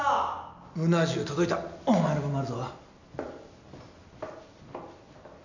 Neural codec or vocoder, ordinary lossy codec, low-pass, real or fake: none; none; 7.2 kHz; real